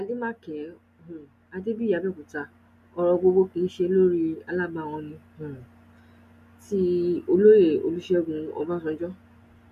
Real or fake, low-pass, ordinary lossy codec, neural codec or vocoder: real; 14.4 kHz; MP3, 64 kbps; none